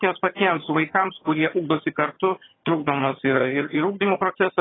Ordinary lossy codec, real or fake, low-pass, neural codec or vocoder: AAC, 16 kbps; fake; 7.2 kHz; vocoder, 22.05 kHz, 80 mel bands, HiFi-GAN